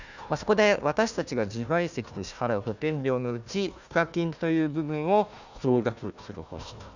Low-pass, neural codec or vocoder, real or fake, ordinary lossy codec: 7.2 kHz; codec, 16 kHz, 1 kbps, FunCodec, trained on Chinese and English, 50 frames a second; fake; none